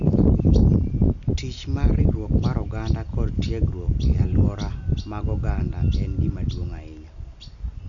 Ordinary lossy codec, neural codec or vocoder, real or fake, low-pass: MP3, 96 kbps; none; real; 7.2 kHz